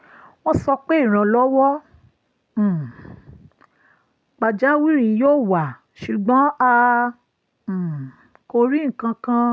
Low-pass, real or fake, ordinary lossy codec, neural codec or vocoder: none; real; none; none